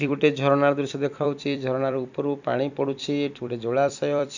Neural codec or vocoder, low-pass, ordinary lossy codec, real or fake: vocoder, 44.1 kHz, 128 mel bands every 256 samples, BigVGAN v2; 7.2 kHz; none; fake